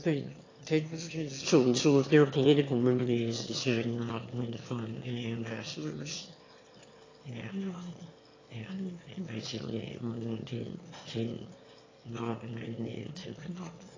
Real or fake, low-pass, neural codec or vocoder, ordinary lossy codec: fake; 7.2 kHz; autoencoder, 22.05 kHz, a latent of 192 numbers a frame, VITS, trained on one speaker; AAC, 32 kbps